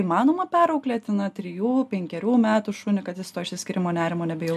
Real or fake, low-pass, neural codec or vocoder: real; 14.4 kHz; none